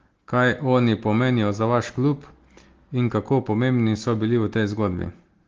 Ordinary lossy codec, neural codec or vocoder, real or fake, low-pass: Opus, 16 kbps; none; real; 7.2 kHz